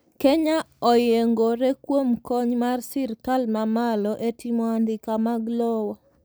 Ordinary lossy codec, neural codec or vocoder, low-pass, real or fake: none; none; none; real